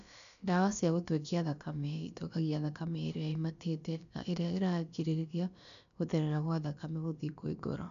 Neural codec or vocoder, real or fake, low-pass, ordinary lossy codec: codec, 16 kHz, about 1 kbps, DyCAST, with the encoder's durations; fake; 7.2 kHz; none